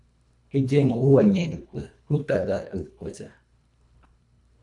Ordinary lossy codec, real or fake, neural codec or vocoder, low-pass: MP3, 96 kbps; fake; codec, 24 kHz, 1.5 kbps, HILCodec; 10.8 kHz